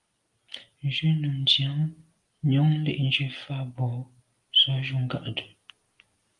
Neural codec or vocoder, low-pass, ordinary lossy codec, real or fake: none; 10.8 kHz; Opus, 32 kbps; real